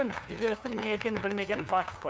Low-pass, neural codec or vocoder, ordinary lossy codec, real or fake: none; codec, 16 kHz, 2 kbps, FunCodec, trained on LibriTTS, 25 frames a second; none; fake